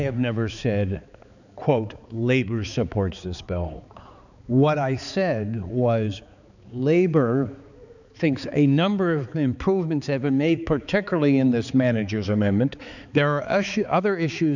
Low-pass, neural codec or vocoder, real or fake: 7.2 kHz; codec, 16 kHz, 4 kbps, X-Codec, HuBERT features, trained on balanced general audio; fake